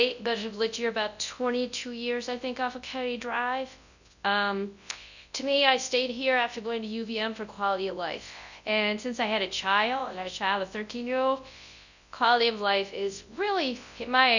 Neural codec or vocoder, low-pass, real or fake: codec, 24 kHz, 0.9 kbps, WavTokenizer, large speech release; 7.2 kHz; fake